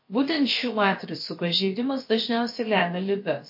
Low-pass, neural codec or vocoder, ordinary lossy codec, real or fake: 5.4 kHz; codec, 16 kHz, about 1 kbps, DyCAST, with the encoder's durations; MP3, 32 kbps; fake